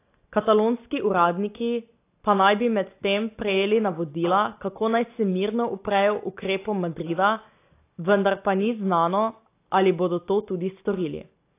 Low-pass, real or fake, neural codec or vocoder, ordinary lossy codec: 3.6 kHz; real; none; AAC, 24 kbps